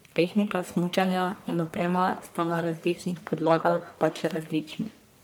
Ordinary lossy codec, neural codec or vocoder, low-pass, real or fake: none; codec, 44.1 kHz, 1.7 kbps, Pupu-Codec; none; fake